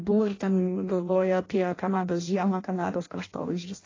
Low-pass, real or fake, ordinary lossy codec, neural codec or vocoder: 7.2 kHz; fake; AAC, 32 kbps; codec, 16 kHz in and 24 kHz out, 0.6 kbps, FireRedTTS-2 codec